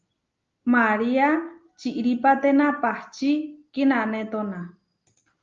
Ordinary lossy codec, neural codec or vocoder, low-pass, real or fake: Opus, 32 kbps; none; 7.2 kHz; real